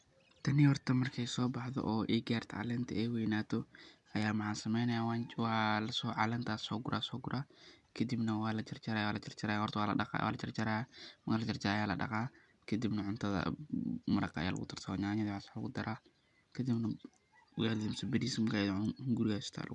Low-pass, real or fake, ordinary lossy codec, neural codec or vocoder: 10.8 kHz; real; none; none